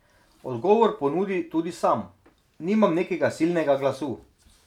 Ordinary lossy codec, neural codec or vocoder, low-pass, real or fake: none; none; 19.8 kHz; real